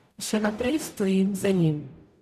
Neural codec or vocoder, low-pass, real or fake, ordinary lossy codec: codec, 44.1 kHz, 0.9 kbps, DAC; 14.4 kHz; fake; none